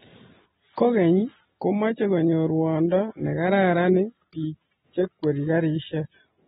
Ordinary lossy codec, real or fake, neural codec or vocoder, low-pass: AAC, 16 kbps; real; none; 19.8 kHz